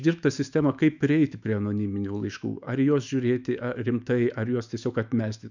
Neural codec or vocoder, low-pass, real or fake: autoencoder, 48 kHz, 128 numbers a frame, DAC-VAE, trained on Japanese speech; 7.2 kHz; fake